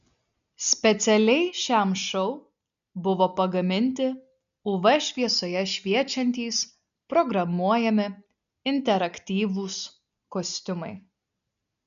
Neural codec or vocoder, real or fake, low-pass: none; real; 7.2 kHz